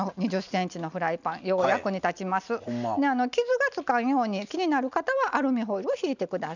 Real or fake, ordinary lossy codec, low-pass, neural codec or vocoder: real; none; 7.2 kHz; none